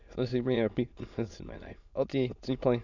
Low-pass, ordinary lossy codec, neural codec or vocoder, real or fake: 7.2 kHz; none; autoencoder, 22.05 kHz, a latent of 192 numbers a frame, VITS, trained on many speakers; fake